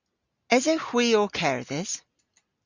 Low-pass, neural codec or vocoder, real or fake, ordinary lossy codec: 7.2 kHz; none; real; Opus, 64 kbps